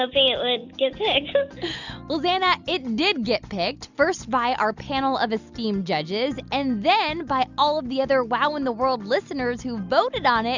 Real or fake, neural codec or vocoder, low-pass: real; none; 7.2 kHz